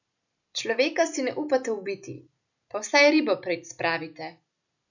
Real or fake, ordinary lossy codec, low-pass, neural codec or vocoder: real; none; 7.2 kHz; none